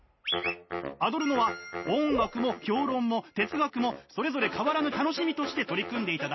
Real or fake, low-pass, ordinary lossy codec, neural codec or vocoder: real; 7.2 kHz; MP3, 24 kbps; none